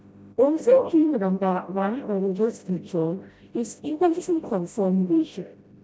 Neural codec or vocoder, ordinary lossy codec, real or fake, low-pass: codec, 16 kHz, 0.5 kbps, FreqCodec, smaller model; none; fake; none